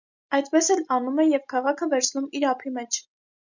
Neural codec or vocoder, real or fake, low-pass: none; real; 7.2 kHz